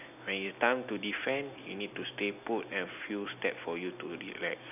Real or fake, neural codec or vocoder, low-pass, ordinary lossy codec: real; none; 3.6 kHz; none